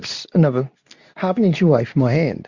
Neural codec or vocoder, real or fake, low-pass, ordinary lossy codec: codec, 24 kHz, 0.9 kbps, WavTokenizer, medium speech release version 2; fake; 7.2 kHz; Opus, 64 kbps